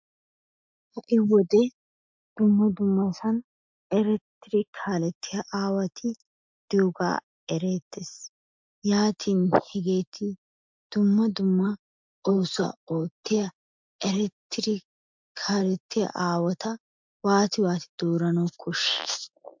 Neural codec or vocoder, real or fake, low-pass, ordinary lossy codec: none; real; 7.2 kHz; MP3, 64 kbps